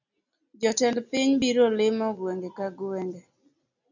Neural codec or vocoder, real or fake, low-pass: none; real; 7.2 kHz